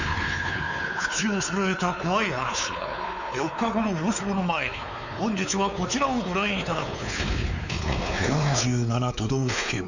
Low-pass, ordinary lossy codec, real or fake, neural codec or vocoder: 7.2 kHz; none; fake; codec, 16 kHz, 4 kbps, X-Codec, WavLM features, trained on Multilingual LibriSpeech